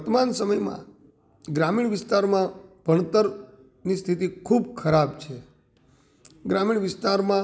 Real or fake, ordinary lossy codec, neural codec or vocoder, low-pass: real; none; none; none